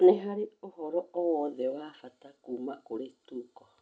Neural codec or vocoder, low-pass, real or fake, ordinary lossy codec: none; none; real; none